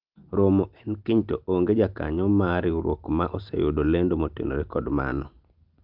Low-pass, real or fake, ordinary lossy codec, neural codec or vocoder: 5.4 kHz; real; Opus, 32 kbps; none